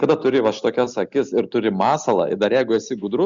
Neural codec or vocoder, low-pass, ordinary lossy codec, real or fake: none; 7.2 kHz; Opus, 64 kbps; real